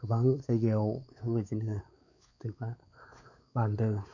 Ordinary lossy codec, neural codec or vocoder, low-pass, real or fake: none; codec, 16 kHz, 4 kbps, X-Codec, WavLM features, trained on Multilingual LibriSpeech; 7.2 kHz; fake